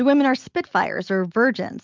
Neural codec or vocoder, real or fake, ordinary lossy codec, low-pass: none; real; Opus, 32 kbps; 7.2 kHz